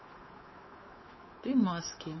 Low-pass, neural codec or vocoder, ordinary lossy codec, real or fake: 7.2 kHz; codec, 24 kHz, 3.1 kbps, DualCodec; MP3, 24 kbps; fake